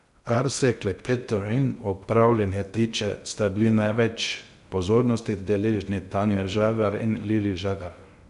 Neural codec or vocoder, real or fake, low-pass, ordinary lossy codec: codec, 16 kHz in and 24 kHz out, 0.6 kbps, FocalCodec, streaming, 2048 codes; fake; 10.8 kHz; none